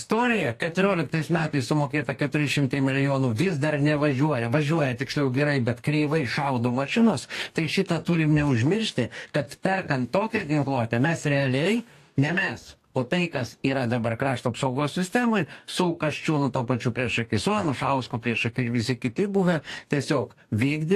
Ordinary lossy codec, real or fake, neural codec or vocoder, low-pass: AAC, 64 kbps; fake; codec, 44.1 kHz, 2.6 kbps, DAC; 14.4 kHz